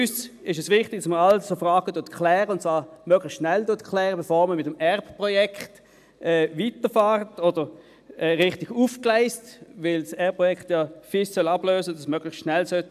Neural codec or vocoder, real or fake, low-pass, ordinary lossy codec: none; real; 14.4 kHz; AAC, 96 kbps